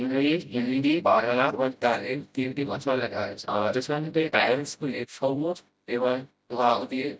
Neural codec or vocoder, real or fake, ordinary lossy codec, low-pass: codec, 16 kHz, 0.5 kbps, FreqCodec, smaller model; fake; none; none